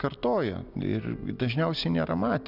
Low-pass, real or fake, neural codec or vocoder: 5.4 kHz; real; none